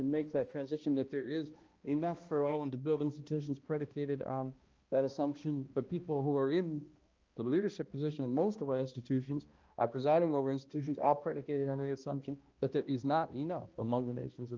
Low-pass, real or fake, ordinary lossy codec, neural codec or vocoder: 7.2 kHz; fake; Opus, 24 kbps; codec, 16 kHz, 1 kbps, X-Codec, HuBERT features, trained on balanced general audio